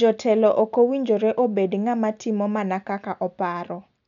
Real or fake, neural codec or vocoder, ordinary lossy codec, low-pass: real; none; none; 7.2 kHz